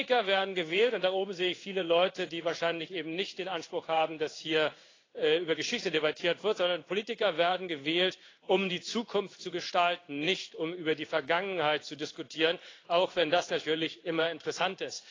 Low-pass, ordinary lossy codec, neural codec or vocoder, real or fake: 7.2 kHz; AAC, 32 kbps; codec, 16 kHz in and 24 kHz out, 1 kbps, XY-Tokenizer; fake